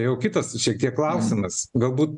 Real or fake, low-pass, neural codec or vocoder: real; 10.8 kHz; none